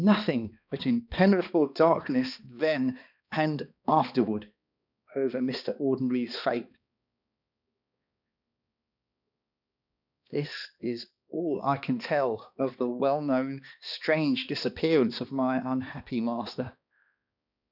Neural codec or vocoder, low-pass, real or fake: codec, 16 kHz, 2 kbps, X-Codec, HuBERT features, trained on balanced general audio; 5.4 kHz; fake